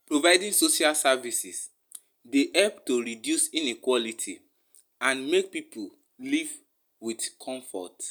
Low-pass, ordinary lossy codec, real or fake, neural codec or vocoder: none; none; real; none